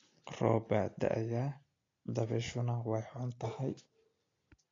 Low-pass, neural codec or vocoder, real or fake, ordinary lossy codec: 7.2 kHz; none; real; AAC, 32 kbps